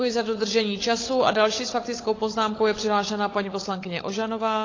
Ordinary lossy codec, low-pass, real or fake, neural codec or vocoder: AAC, 32 kbps; 7.2 kHz; fake; codec, 16 kHz, 16 kbps, FunCodec, trained on LibriTTS, 50 frames a second